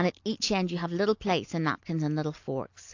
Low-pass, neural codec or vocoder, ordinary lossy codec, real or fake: 7.2 kHz; none; AAC, 48 kbps; real